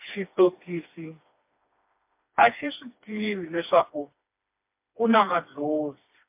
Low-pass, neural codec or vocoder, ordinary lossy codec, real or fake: 3.6 kHz; codec, 16 kHz, 2 kbps, FreqCodec, smaller model; MP3, 32 kbps; fake